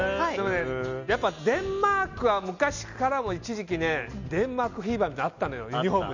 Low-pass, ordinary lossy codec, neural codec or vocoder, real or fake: 7.2 kHz; none; none; real